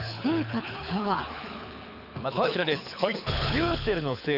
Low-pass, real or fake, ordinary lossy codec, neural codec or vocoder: 5.4 kHz; fake; none; codec, 24 kHz, 6 kbps, HILCodec